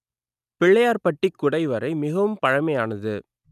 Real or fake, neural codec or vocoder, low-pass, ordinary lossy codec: real; none; 14.4 kHz; none